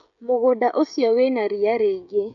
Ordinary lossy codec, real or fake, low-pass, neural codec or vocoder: none; fake; 7.2 kHz; codec, 16 kHz, 16 kbps, FreqCodec, smaller model